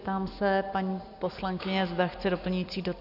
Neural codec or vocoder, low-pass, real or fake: none; 5.4 kHz; real